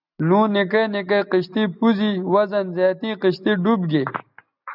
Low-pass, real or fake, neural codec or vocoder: 5.4 kHz; real; none